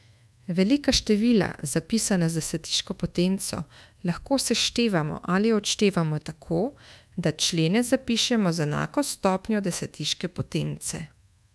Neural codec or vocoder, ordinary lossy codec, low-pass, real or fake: codec, 24 kHz, 1.2 kbps, DualCodec; none; none; fake